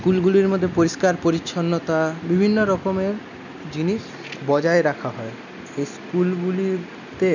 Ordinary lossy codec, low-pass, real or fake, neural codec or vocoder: none; 7.2 kHz; real; none